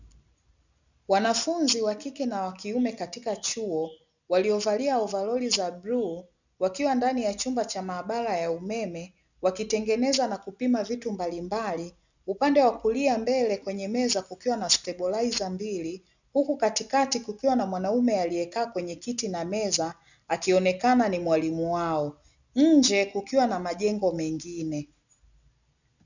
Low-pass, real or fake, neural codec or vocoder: 7.2 kHz; real; none